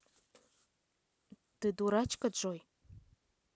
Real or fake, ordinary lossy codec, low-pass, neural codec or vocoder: real; none; none; none